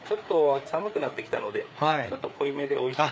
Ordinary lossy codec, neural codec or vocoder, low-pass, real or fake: none; codec, 16 kHz, 4 kbps, FreqCodec, larger model; none; fake